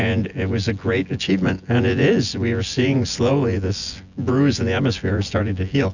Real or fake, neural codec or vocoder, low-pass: fake; vocoder, 24 kHz, 100 mel bands, Vocos; 7.2 kHz